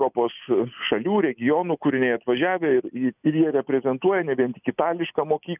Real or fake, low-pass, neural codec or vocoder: real; 3.6 kHz; none